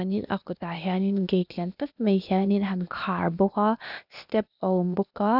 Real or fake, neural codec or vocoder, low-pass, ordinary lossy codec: fake; codec, 16 kHz, 0.8 kbps, ZipCodec; 5.4 kHz; none